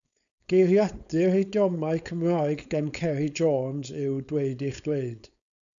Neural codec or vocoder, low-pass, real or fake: codec, 16 kHz, 4.8 kbps, FACodec; 7.2 kHz; fake